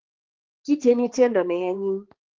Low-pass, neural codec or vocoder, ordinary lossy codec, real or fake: 7.2 kHz; codec, 16 kHz, 2 kbps, X-Codec, HuBERT features, trained on balanced general audio; Opus, 16 kbps; fake